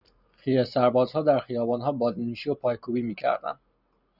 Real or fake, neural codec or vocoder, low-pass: real; none; 5.4 kHz